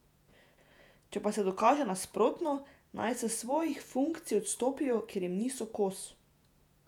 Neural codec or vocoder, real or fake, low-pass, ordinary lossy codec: vocoder, 48 kHz, 128 mel bands, Vocos; fake; 19.8 kHz; none